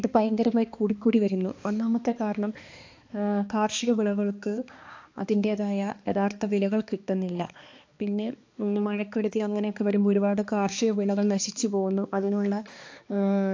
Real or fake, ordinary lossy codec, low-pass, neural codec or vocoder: fake; AAC, 48 kbps; 7.2 kHz; codec, 16 kHz, 2 kbps, X-Codec, HuBERT features, trained on balanced general audio